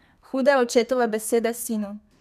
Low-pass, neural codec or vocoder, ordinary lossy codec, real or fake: 14.4 kHz; codec, 32 kHz, 1.9 kbps, SNAC; none; fake